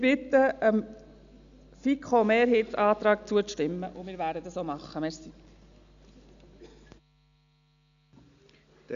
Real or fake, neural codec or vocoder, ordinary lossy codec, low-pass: real; none; none; 7.2 kHz